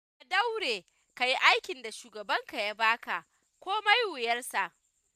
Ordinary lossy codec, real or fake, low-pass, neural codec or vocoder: none; real; 14.4 kHz; none